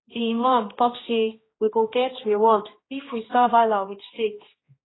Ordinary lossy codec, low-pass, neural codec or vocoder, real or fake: AAC, 16 kbps; 7.2 kHz; codec, 16 kHz, 1 kbps, X-Codec, HuBERT features, trained on general audio; fake